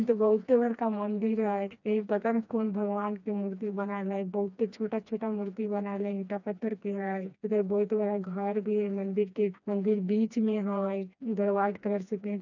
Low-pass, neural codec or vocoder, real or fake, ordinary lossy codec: 7.2 kHz; codec, 16 kHz, 2 kbps, FreqCodec, smaller model; fake; none